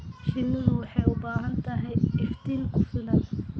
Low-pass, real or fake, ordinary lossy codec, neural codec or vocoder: none; real; none; none